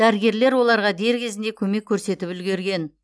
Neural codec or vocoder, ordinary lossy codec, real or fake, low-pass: none; none; real; none